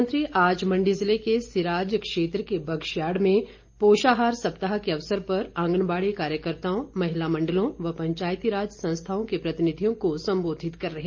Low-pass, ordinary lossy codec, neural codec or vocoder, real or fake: 7.2 kHz; Opus, 24 kbps; none; real